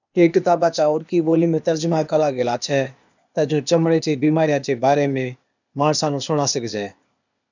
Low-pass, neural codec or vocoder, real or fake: 7.2 kHz; codec, 16 kHz, 0.8 kbps, ZipCodec; fake